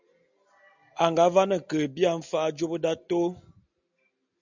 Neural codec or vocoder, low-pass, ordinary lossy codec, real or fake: none; 7.2 kHz; MP3, 64 kbps; real